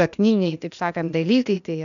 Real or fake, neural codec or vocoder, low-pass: fake; codec, 16 kHz, 0.8 kbps, ZipCodec; 7.2 kHz